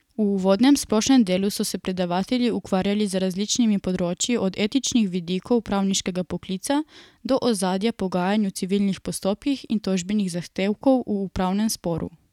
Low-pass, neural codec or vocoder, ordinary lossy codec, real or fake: 19.8 kHz; none; none; real